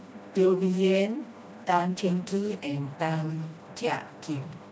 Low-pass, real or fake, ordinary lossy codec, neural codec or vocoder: none; fake; none; codec, 16 kHz, 1 kbps, FreqCodec, smaller model